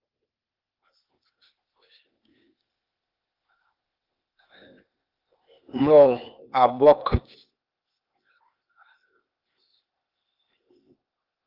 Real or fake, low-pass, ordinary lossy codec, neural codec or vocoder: fake; 5.4 kHz; Opus, 24 kbps; codec, 16 kHz, 0.8 kbps, ZipCodec